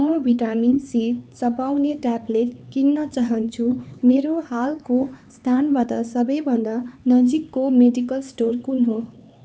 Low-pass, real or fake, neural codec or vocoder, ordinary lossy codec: none; fake; codec, 16 kHz, 4 kbps, X-Codec, HuBERT features, trained on LibriSpeech; none